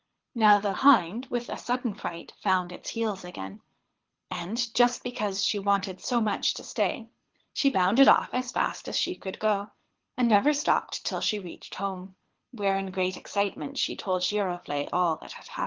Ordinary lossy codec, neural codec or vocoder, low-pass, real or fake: Opus, 16 kbps; codec, 24 kHz, 6 kbps, HILCodec; 7.2 kHz; fake